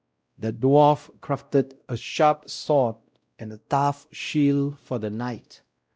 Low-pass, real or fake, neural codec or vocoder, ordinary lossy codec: none; fake; codec, 16 kHz, 0.5 kbps, X-Codec, WavLM features, trained on Multilingual LibriSpeech; none